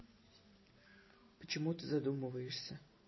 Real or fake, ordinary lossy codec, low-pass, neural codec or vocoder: real; MP3, 24 kbps; 7.2 kHz; none